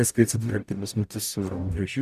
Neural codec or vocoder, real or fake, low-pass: codec, 44.1 kHz, 0.9 kbps, DAC; fake; 14.4 kHz